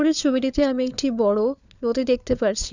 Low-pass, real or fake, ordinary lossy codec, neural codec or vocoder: 7.2 kHz; fake; none; codec, 16 kHz, 4 kbps, FunCodec, trained on LibriTTS, 50 frames a second